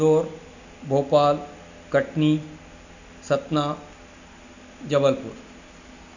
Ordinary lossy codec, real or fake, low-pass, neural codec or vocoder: none; real; 7.2 kHz; none